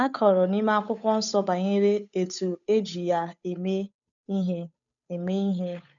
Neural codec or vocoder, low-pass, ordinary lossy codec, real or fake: codec, 16 kHz, 8 kbps, FunCodec, trained on Chinese and English, 25 frames a second; 7.2 kHz; none; fake